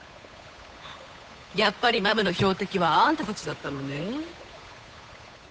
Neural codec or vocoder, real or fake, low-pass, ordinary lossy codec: codec, 16 kHz, 8 kbps, FunCodec, trained on Chinese and English, 25 frames a second; fake; none; none